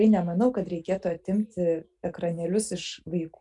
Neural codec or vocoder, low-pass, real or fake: none; 10.8 kHz; real